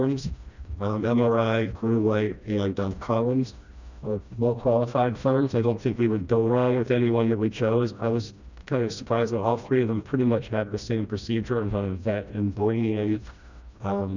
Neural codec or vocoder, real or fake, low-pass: codec, 16 kHz, 1 kbps, FreqCodec, smaller model; fake; 7.2 kHz